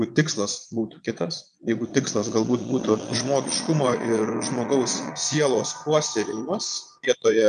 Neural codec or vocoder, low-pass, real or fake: vocoder, 22.05 kHz, 80 mel bands, Vocos; 9.9 kHz; fake